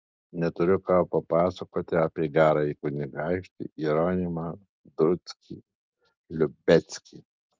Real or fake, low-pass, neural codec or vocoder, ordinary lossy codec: real; 7.2 kHz; none; Opus, 24 kbps